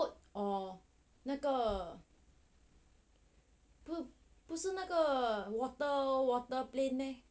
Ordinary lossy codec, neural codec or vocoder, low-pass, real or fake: none; none; none; real